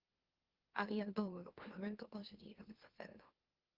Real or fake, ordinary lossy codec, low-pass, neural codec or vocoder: fake; Opus, 16 kbps; 5.4 kHz; autoencoder, 44.1 kHz, a latent of 192 numbers a frame, MeloTTS